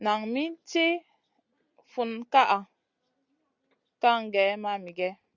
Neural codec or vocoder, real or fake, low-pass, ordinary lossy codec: none; real; 7.2 kHz; Opus, 64 kbps